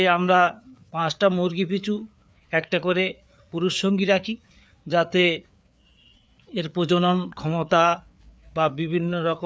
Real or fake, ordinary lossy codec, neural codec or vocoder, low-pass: fake; none; codec, 16 kHz, 4 kbps, FreqCodec, larger model; none